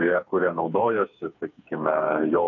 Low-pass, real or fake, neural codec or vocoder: 7.2 kHz; fake; codec, 16 kHz, 4 kbps, FreqCodec, smaller model